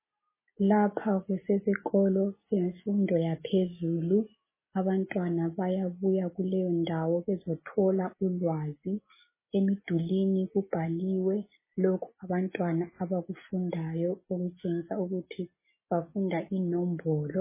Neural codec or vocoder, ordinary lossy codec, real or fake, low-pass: none; MP3, 16 kbps; real; 3.6 kHz